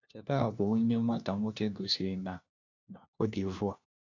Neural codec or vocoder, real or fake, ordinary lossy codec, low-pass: codec, 16 kHz, 1 kbps, FunCodec, trained on LibriTTS, 50 frames a second; fake; none; 7.2 kHz